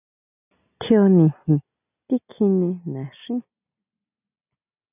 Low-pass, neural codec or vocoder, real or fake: 3.6 kHz; none; real